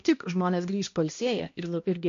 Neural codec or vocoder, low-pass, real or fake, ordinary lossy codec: codec, 16 kHz, 1 kbps, X-Codec, HuBERT features, trained on balanced general audio; 7.2 kHz; fake; MP3, 48 kbps